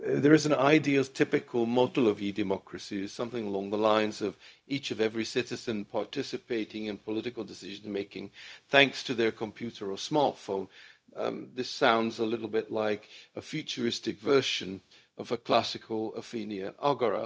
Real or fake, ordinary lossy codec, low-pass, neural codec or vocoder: fake; none; none; codec, 16 kHz, 0.4 kbps, LongCat-Audio-Codec